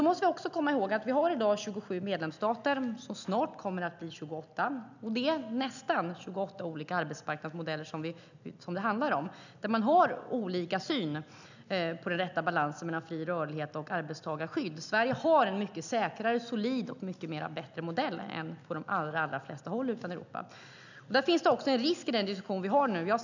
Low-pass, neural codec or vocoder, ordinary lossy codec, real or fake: 7.2 kHz; none; none; real